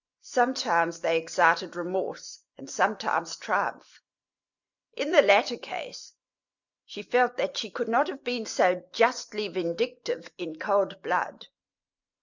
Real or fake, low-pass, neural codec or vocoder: real; 7.2 kHz; none